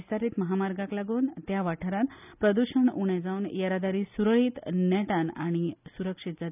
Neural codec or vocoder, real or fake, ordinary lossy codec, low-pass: none; real; none; 3.6 kHz